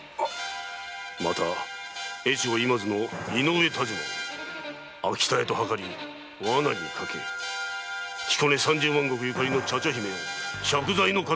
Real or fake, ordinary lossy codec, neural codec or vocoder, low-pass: real; none; none; none